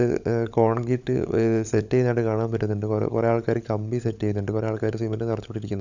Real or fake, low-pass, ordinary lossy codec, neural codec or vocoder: fake; 7.2 kHz; none; codec, 16 kHz, 16 kbps, FunCodec, trained on LibriTTS, 50 frames a second